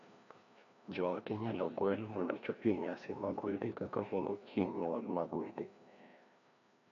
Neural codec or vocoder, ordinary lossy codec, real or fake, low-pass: codec, 16 kHz, 1 kbps, FreqCodec, larger model; none; fake; 7.2 kHz